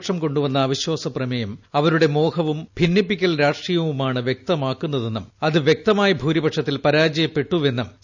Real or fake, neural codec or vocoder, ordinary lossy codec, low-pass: real; none; none; 7.2 kHz